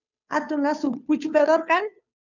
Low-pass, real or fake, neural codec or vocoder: 7.2 kHz; fake; codec, 16 kHz, 2 kbps, FunCodec, trained on Chinese and English, 25 frames a second